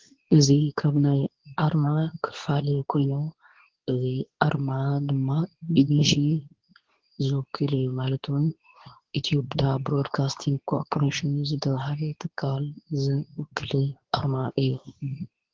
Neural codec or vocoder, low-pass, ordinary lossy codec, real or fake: codec, 24 kHz, 0.9 kbps, WavTokenizer, medium speech release version 2; 7.2 kHz; Opus, 16 kbps; fake